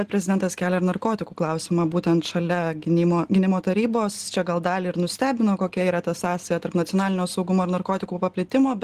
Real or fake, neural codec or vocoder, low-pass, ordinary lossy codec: real; none; 14.4 kHz; Opus, 16 kbps